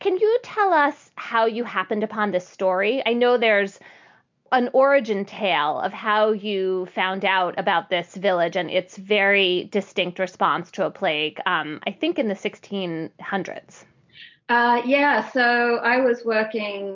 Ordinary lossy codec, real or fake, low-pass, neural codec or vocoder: MP3, 64 kbps; real; 7.2 kHz; none